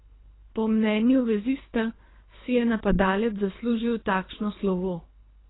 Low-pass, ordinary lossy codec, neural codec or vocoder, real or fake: 7.2 kHz; AAC, 16 kbps; codec, 24 kHz, 3 kbps, HILCodec; fake